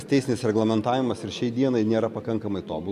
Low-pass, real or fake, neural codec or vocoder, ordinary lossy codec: 14.4 kHz; real; none; MP3, 96 kbps